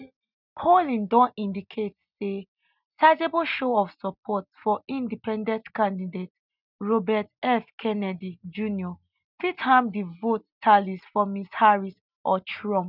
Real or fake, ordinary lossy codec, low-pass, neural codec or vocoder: real; none; 5.4 kHz; none